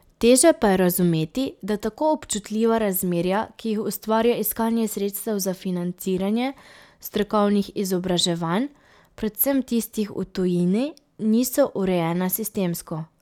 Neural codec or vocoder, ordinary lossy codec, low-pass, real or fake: none; none; 19.8 kHz; real